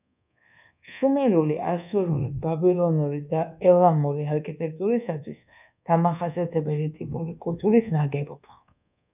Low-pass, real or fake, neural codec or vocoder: 3.6 kHz; fake; codec, 24 kHz, 1.2 kbps, DualCodec